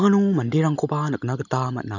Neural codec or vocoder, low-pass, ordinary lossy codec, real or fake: none; 7.2 kHz; none; real